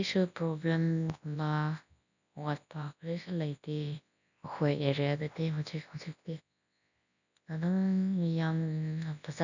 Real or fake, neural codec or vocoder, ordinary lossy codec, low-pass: fake; codec, 24 kHz, 0.9 kbps, WavTokenizer, large speech release; none; 7.2 kHz